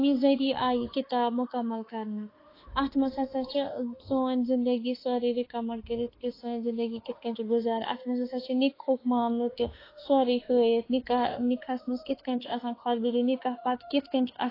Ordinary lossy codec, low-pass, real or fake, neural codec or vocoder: AAC, 24 kbps; 5.4 kHz; fake; autoencoder, 48 kHz, 32 numbers a frame, DAC-VAE, trained on Japanese speech